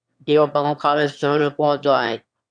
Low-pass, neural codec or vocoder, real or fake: 9.9 kHz; autoencoder, 22.05 kHz, a latent of 192 numbers a frame, VITS, trained on one speaker; fake